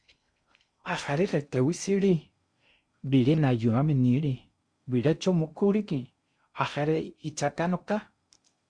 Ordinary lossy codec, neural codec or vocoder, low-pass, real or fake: Opus, 64 kbps; codec, 16 kHz in and 24 kHz out, 0.6 kbps, FocalCodec, streaming, 2048 codes; 9.9 kHz; fake